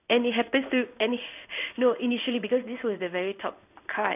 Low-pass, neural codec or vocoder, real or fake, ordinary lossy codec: 3.6 kHz; codec, 16 kHz in and 24 kHz out, 1 kbps, XY-Tokenizer; fake; none